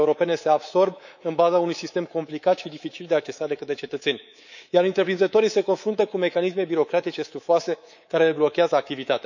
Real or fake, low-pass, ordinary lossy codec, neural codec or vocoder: fake; 7.2 kHz; none; codec, 24 kHz, 3.1 kbps, DualCodec